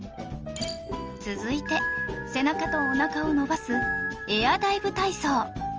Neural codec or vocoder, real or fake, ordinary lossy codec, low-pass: none; real; Opus, 24 kbps; 7.2 kHz